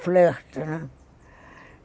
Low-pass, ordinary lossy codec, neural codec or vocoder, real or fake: none; none; none; real